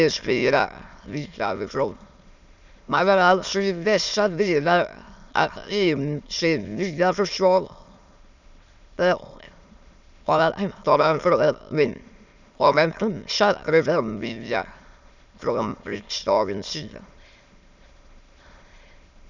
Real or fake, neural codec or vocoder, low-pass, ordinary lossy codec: fake; autoencoder, 22.05 kHz, a latent of 192 numbers a frame, VITS, trained on many speakers; 7.2 kHz; none